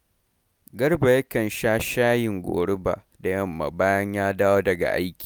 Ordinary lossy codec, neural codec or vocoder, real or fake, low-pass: none; none; real; none